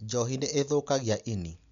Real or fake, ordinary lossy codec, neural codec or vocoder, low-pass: real; none; none; 7.2 kHz